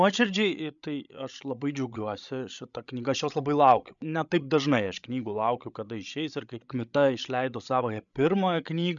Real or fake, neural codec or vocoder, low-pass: fake; codec, 16 kHz, 16 kbps, FreqCodec, larger model; 7.2 kHz